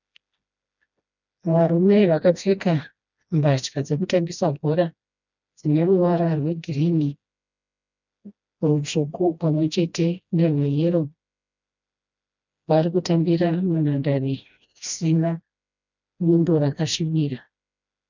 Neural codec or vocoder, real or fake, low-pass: codec, 16 kHz, 1 kbps, FreqCodec, smaller model; fake; 7.2 kHz